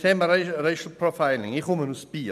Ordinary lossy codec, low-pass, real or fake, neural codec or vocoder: none; 14.4 kHz; real; none